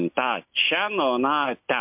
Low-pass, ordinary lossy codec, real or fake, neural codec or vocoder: 3.6 kHz; MP3, 32 kbps; real; none